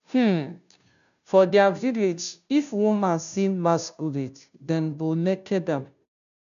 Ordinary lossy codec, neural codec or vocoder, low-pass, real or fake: none; codec, 16 kHz, 0.5 kbps, FunCodec, trained on Chinese and English, 25 frames a second; 7.2 kHz; fake